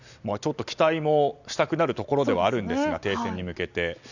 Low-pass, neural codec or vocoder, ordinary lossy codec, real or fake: 7.2 kHz; none; none; real